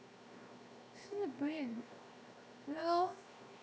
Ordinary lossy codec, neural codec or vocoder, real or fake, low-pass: none; codec, 16 kHz, 0.7 kbps, FocalCodec; fake; none